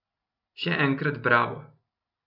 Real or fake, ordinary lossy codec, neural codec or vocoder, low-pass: real; none; none; 5.4 kHz